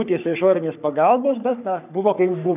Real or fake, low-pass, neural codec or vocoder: fake; 3.6 kHz; codec, 44.1 kHz, 3.4 kbps, Pupu-Codec